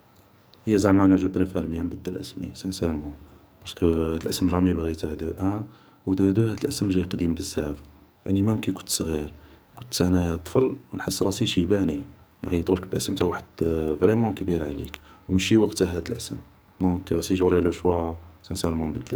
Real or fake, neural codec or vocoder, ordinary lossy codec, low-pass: fake; codec, 44.1 kHz, 2.6 kbps, SNAC; none; none